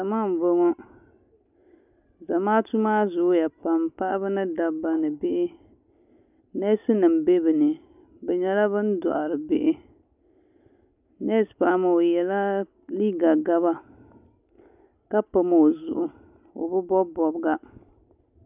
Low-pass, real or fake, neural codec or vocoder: 3.6 kHz; real; none